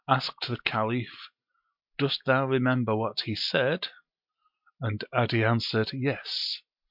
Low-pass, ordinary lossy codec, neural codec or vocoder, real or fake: 5.4 kHz; MP3, 48 kbps; none; real